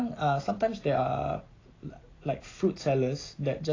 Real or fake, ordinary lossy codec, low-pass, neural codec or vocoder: real; AAC, 32 kbps; 7.2 kHz; none